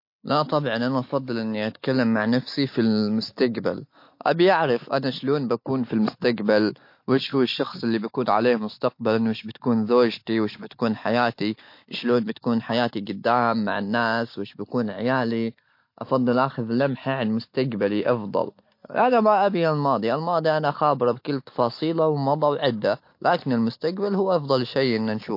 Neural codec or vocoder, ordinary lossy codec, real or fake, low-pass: none; MP3, 32 kbps; real; 5.4 kHz